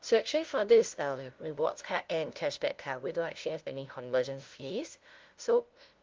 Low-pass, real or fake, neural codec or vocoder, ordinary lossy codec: 7.2 kHz; fake; codec, 16 kHz, 0.5 kbps, FunCodec, trained on LibriTTS, 25 frames a second; Opus, 32 kbps